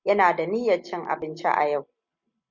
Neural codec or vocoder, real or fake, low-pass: none; real; 7.2 kHz